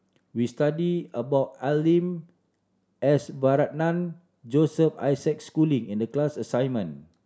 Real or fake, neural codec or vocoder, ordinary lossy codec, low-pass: real; none; none; none